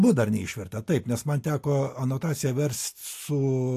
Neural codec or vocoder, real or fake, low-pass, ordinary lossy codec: none; real; 14.4 kHz; AAC, 64 kbps